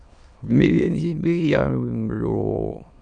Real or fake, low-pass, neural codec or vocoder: fake; 9.9 kHz; autoencoder, 22.05 kHz, a latent of 192 numbers a frame, VITS, trained on many speakers